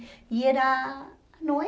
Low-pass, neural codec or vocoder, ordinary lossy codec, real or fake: none; none; none; real